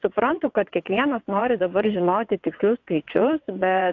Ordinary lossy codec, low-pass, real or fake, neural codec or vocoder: AAC, 48 kbps; 7.2 kHz; fake; vocoder, 22.05 kHz, 80 mel bands, WaveNeXt